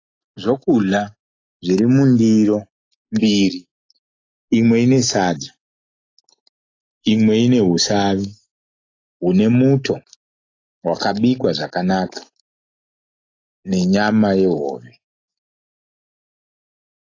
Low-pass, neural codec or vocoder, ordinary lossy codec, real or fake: 7.2 kHz; none; AAC, 32 kbps; real